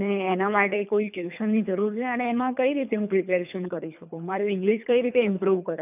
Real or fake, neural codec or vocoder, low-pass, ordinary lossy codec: fake; codec, 24 kHz, 3 kbps, HILCodec; 3.6 kHz; none